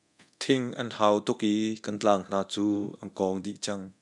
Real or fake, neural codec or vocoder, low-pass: fake; codec, 24 kHz, 0.9 kbps, DualCodec; 10.8 kHz